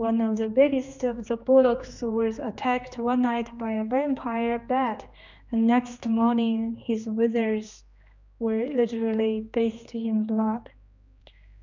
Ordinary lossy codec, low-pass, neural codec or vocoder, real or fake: MP3, 64 kbps; 7.2 kHz; codec, 16 kHz, 2 kbps, X-Codec, HuBERT features, trained on general audio; fake